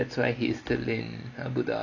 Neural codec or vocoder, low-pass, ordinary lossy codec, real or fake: none; 7.2 kHz; none; real